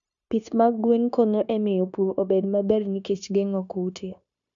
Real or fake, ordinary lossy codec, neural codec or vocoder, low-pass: fake; none; codec, 16 kHz, 0.9 kbps, LongCat-Audio-Codec; 7.2 kHz